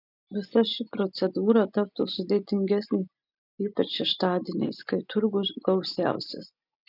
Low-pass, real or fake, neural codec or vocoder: 5.4 kHz; real; none